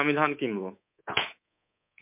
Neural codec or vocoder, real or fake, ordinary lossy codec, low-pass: codec, 24 kHz, 3.1 kbps, DualCodec; fake; MP3, 32 kbps; 3.6 kHz